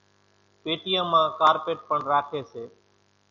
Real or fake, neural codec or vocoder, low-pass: real; none; 7.2 kHz